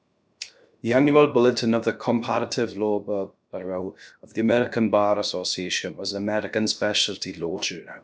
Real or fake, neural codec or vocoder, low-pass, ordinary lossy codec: fake; codec, 16 kHz, 0.7 kbps, FocalCodec; none; none